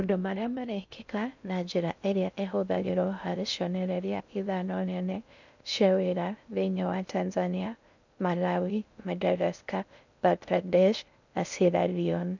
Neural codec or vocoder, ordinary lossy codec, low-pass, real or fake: codec, 16 kHz in and 24 kHz out, 0.6 kbps, FocalCodec, streaming, 2048 codes; none; 7.2 kHz; fake